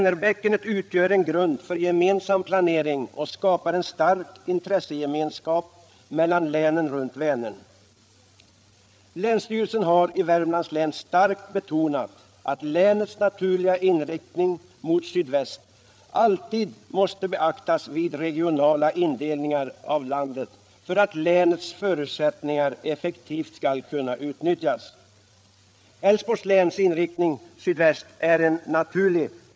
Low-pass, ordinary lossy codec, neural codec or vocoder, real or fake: none; none; codec, 16 kHz, 8 kbps, FreqCodec, larger model; fake